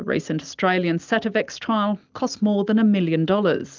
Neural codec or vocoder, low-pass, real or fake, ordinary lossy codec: none; 7.2 kHz; real; Opus, 24 kbps